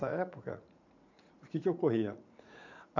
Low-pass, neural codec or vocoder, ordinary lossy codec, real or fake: 7.2 kHz; vocoder, 44.1 kHz, 80 mel bands, Vocos; none; fake